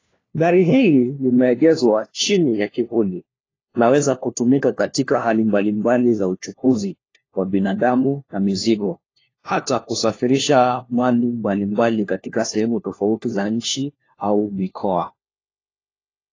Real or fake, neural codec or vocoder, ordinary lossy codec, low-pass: fake; codec, 16 kHz, 1 kbps, FunCodec, trained on LibriTTS, 50 frames a second; AAC, 32 kbps; 7.2 kHz